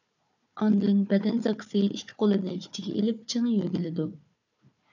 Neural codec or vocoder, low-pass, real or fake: codec, 16 kHz, 4 kbps, FunCodec, trained on Chinese and English, 50 frames a second; 7.2 kHz; fake